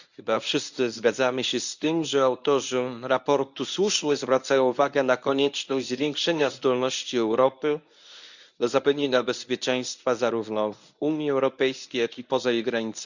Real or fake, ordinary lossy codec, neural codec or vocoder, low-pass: fake; none; codec, 24 kHz, 0.9 kbps, WavTokenizer, medium speech release version 1; 7.2 kHz